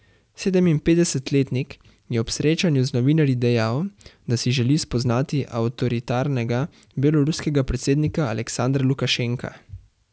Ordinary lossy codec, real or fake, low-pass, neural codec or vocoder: none; real; none; none